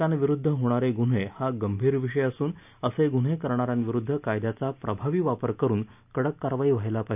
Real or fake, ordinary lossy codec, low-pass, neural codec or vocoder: real; none; 3.6 kHz; none